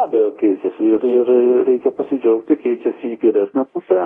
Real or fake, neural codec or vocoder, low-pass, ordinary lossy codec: fake; codec, 24 kHz, 0.9 kbps, DualCodec; 10.8 kHz; AAC, 32 kbps